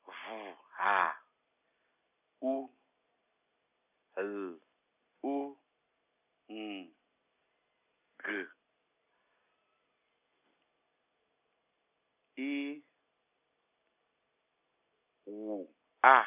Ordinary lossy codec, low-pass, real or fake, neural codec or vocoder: none; 3.6 kHz; real; none